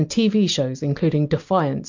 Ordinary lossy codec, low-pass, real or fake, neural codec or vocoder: MP3, 48 kbps; 7.2 kHz; real; none